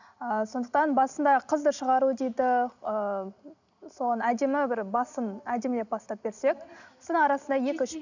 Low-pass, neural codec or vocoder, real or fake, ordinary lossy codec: 7.2 kHz; none; real; none